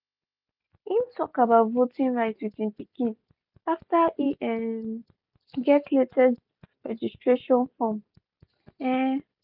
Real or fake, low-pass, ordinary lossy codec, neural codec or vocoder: real; 5.4 kHz; none; none